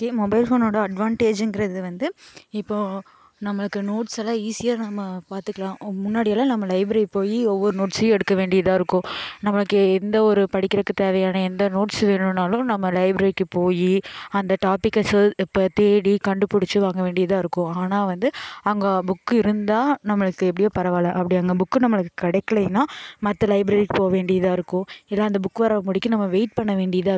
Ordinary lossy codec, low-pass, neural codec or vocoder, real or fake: none; none; none; real